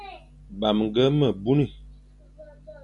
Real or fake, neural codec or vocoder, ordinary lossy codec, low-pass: real; none; MP3, 96 kbps; 10.8 kHz